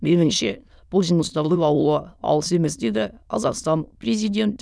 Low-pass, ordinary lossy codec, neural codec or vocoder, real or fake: none; none; autoencoder, 22.05 kHz, a latent of 192 numbers a frame, VITS, trained on many speakers; fake